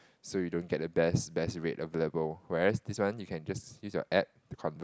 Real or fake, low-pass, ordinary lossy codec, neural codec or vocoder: real; none; none; none